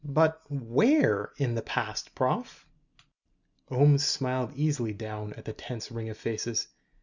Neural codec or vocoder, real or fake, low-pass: none; real; 7.2 kHz